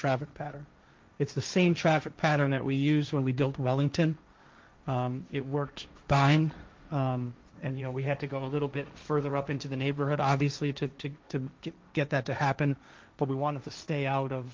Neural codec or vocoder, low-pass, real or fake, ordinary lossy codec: codec, 16 kHz, 1.1 kbps, Voila-Tokenizer; 7.2 kHz; fake; Opus, 24 kbps